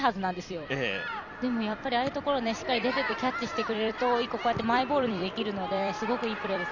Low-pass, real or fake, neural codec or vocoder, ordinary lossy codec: 7.2 kHz; fake; vocoder, 44.1 kHz, 128 mel bands every 256 samples, BigVGAN v2; none